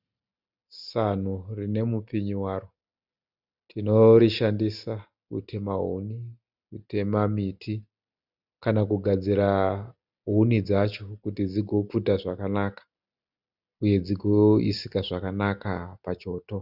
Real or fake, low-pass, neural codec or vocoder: real; 5.4 kHz; none